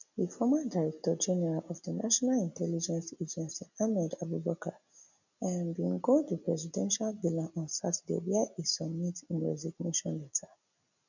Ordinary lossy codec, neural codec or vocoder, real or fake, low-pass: none; none; real; 7.2 kHz